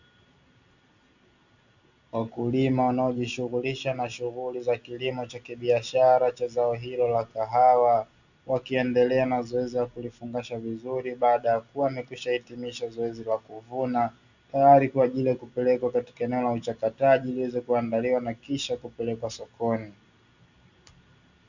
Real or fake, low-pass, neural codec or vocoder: real; 7.2 kHz; none